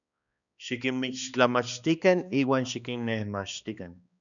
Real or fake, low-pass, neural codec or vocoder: fake; 7.2 kHz; codec, 16 kHz, 2 kbps, X-Codec, HuBERT features, trained on balanced general audio